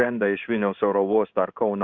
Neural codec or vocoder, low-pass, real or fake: codec, 16 kHz in and 24 kHz out, 1 kbps, XY-Tokenizer; 7.2 kHz; fake